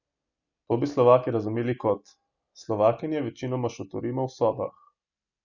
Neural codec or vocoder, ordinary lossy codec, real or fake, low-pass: none; none; real; 7.2 kHz